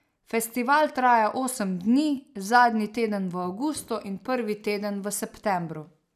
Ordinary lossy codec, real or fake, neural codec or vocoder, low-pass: none; real; none; 14.4 kHz